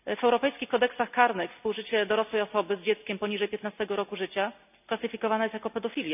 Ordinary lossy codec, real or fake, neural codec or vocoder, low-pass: none; real; none; 3.6 kHz